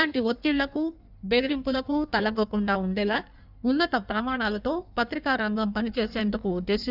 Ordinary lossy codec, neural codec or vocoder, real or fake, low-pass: none; codec, 16 kHz in and 24 kHz out, 1.1 kbps, FireRedTTS-2 codec; fake; 5.4 kHz